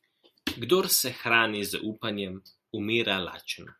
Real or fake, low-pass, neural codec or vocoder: real; 14.4 kHz; none